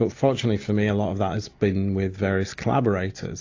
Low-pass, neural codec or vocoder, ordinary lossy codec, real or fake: 7.2 kHz; none; AAC, 48 kbps; real